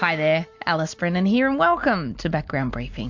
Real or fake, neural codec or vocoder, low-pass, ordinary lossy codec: real; none; 7.2 kHz; MP3, 64 kbps